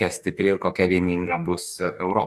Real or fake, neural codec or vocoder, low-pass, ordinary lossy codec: fake; codec, 44.1 kHz, 2.6 kbps, DAC; 14.4 kHz; AAC, 96 kbps